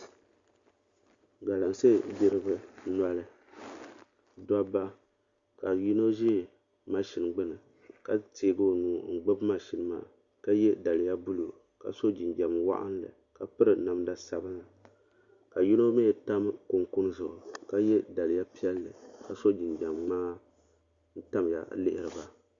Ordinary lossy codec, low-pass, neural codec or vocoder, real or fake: Opus, 64 kbps; 7.2 kHz; none; real